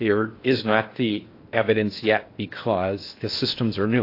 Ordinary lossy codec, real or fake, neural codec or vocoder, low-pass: AAC, 32 kbps; fake; codec, 16 kHz in and 24 kHz out, 0.8 kbps, FocalCodec, streaming, 65536 codes; 5.4 kHz